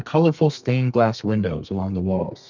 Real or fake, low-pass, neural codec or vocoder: fake; 7.2 kHz; codec, 32 kHz, 1.9 kbps, SNAC